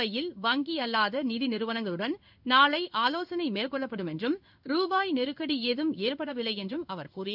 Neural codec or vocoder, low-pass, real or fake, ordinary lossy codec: codec, 16 kHz in and 24 kHz out, 1 kbps, XY-Tokenizer; 5.4 kHz; fake; none